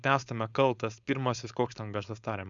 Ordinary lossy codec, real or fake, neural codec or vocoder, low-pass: Opus, 64 kbps; fake; codec, 16 kHz, 4.8 kbps, FACodec; 7.2 kHz